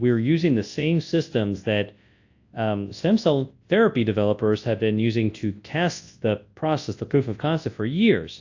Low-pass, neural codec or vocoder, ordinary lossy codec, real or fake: 7.2 kHz; codec, 24 kHz, 0.9 kbps, WavTokenizer, large speech release; AAC, 48 kbps; fake